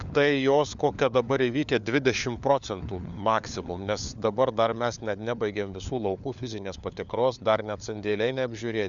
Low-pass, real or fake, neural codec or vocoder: 7.2 kHz; fake; codec, 16 kHz, 4 kbps, FunCodec, trained on LibriTTS, 50 frames a second